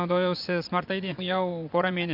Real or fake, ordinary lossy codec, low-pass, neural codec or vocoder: fake; MP3, 48 kbps; 5.4 kHz; vocoder, 24 kHz, 100 mel bands, Vocos